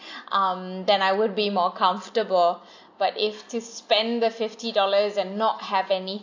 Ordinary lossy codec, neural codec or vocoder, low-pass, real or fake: AAC, 48 kbps; none; 7.2 kHz; real